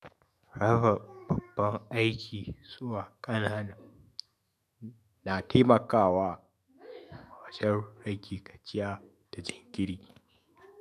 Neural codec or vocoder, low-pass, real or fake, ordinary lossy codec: autoencoder, 48 kHz, 128 numbers a frame, DAC-VAE, trained on Japanese speech; 14.4 kHz; fake; MP3, 96 kbps